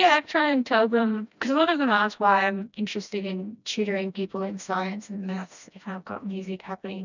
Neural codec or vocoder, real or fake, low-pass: codec, 16 kHz, 1 kbps, FreqCodec, smaller model; fake; 7.2 kHz